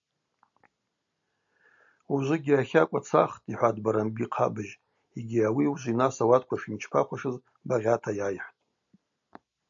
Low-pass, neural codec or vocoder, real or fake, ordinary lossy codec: 7.2 kHz; none; real; MP3, 64 kbps